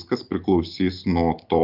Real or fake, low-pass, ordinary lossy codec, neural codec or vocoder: real; 5.4 kHz; Opus, 24 kbps; none